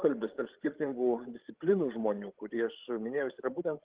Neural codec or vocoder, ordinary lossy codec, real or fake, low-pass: codec, 16 kHz, 16 kbps, FreqCodec, larger model; Opus, 16 kbps; fake; 3.6 kHz